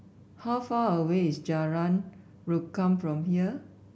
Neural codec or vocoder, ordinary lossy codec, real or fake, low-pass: none; none; real; none